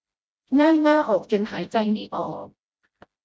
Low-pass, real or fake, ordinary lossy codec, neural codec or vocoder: none; fake; none; codec, 16 kHz, 0.5 kbps, FreqCodec, smaller model